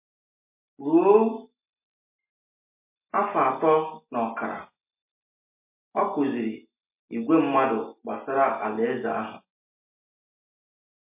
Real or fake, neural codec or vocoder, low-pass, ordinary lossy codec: real; none; 3.6 kHz; MP3, 24 kbps